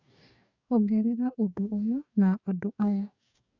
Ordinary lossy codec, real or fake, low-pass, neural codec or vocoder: none; fake; 7.2 kHz; codec, 44.1 kHz, 2.6 kbps, DAC